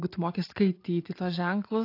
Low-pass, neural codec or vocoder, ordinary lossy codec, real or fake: 5.4 kHz; none; AAC, 32 kbps; real